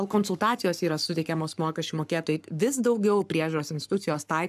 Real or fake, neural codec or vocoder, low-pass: fake; codec, 44.1 kHz, 7.8 kbps, Pupu-Codec; 14.4 kHz